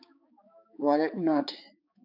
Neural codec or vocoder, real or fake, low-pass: codec, 16 kHz, 4 kbps, X-Codec, HuBERT features, trained on balanced general audio; fake; 5.4 kHz